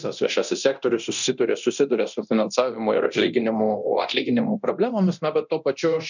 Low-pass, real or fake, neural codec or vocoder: 7.2 kHz; fake; codec, 24 kHz, 0.9 kbps, DualCodec